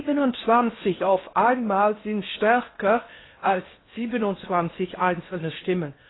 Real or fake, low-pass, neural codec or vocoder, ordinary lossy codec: fake; 7.2 kHz; codec, 16 kHz in and 24 kHz out, 0.6 kbps, FocalCodec, streaming, 4096 codes; AAC, 16 kbps